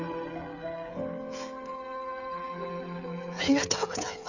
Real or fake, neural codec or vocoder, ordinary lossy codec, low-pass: fake; codec, 16 kHz, 16 kbps, FreqCodec, smaller model; none; 7.2 kHz